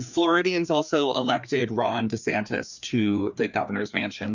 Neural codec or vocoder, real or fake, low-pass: codec, 16 kHz, 2 kbps, FreqCodec, larger model; fake; 7.2 kHz